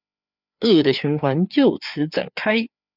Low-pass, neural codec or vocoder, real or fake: 5.4 kHz; codec, 16 kHz, 4 kbps, FreqCodec, larger model; fake